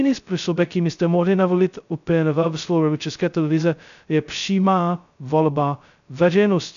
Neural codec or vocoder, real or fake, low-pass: codec, 16 kHz, 0.2 kbps, FocalCodec; fake; 7.2 kHz